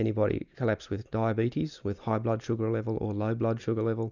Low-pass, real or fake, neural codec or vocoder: 7.2 kHz; real; none